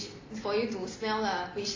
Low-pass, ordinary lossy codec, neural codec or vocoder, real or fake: 7.2 kHz; AAC, 32 kbps; none; real